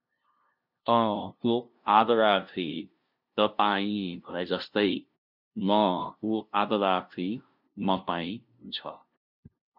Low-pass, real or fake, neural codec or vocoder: 5.4 kHz; fake; codec, 16 kHz, 0.5 kbps, FunCodec, trained on LibriTTS, 25 frames a second